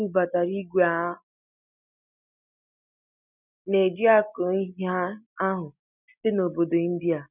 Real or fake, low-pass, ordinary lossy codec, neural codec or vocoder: real; 3.6 kHz; none; none